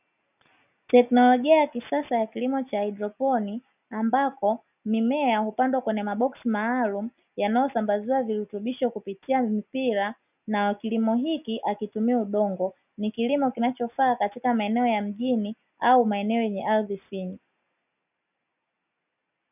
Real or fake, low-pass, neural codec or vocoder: real; 3.6 kHz; none